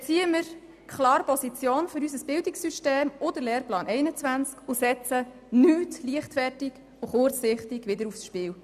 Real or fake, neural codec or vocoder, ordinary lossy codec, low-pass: real; none; none; 14.4 kHz